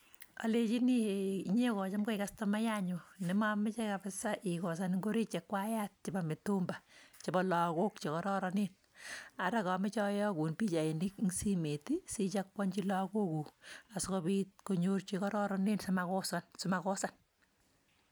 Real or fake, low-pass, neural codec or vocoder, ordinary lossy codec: real; none; none; none